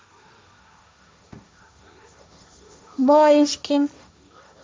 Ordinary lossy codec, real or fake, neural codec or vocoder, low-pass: none; fake; codec, 16 kHz, 1.1 kbps, Voila-Tokenizer; none